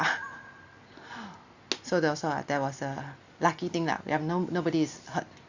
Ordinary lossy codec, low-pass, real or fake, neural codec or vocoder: Opus, 64 kbps; 7.2 kHz; real; none